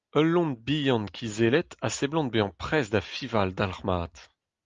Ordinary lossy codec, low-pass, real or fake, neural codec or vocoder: Opus, 32 kbps; 7.2 kHz; real; none